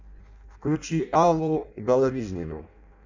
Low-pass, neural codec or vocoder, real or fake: 7.2 kHz; codec, 16 kHz in and 24 kHz out, 0.6 kbps, FireRedTTS-2 codec; fake